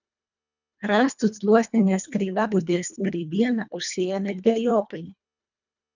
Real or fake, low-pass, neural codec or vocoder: fake; 7.2 kHz; codec, 24 kHz, 1.5 kbps, HILCodec